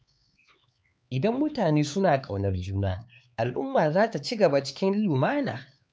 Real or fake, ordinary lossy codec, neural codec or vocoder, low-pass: fake; none; codec, 16 kHz, 4 kbps, X-Codec, HuBERT features, trained on LibriSpeech; none